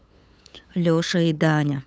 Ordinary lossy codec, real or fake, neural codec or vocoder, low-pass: none; fake; codec, 16 kHz, 8 kbps, FunCodec, trained on LibriTTS, 25 frames a second; none